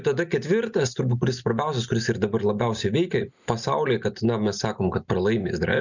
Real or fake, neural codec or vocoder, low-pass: real; none; 7.2 kHz